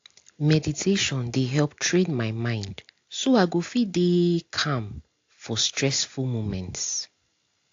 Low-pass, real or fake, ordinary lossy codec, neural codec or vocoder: 7.2 kHz; real; AAC, 48 kbps; none